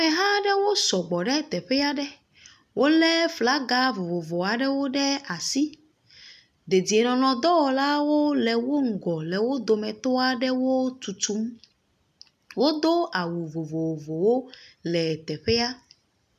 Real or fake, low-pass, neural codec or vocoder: real; 14.4 kHz; none